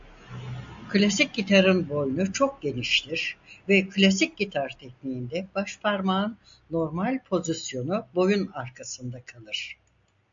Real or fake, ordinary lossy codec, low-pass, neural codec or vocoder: real; AAC, 64 kbps; 7.2 kHz; none